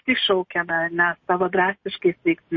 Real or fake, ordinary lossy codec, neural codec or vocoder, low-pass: real; MP3, 32 kbps; none; 7.2 kHz